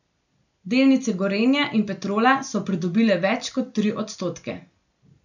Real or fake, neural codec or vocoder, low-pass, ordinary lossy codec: real; none; 7.2 kHz; none